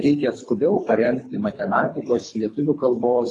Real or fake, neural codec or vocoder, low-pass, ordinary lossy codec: fake; codec, 24 kHz, 3 kbps, HILCodec; 10.8 kHz; AAC, 32 kbps